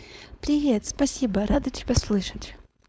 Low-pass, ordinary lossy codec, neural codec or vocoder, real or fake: none; none; codec, 16 kHz, 4.8 kbps, FACodec; fake